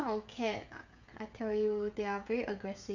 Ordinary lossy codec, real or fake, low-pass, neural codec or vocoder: none; fake; 7.2 kHz; codec, 16 kHz, 16 kbps, FreqCodec, smaller model